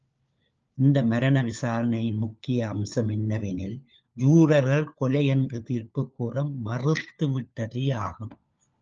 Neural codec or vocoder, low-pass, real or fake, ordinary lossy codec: codec, 16 kHz, 4 kbps, FunCodec, trained on LibriTTS, 50 frames a second; 7.2 kHz; fake; Opus, 24 kbps